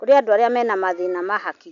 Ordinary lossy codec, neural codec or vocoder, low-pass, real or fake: none; none; 7.2 kHz; real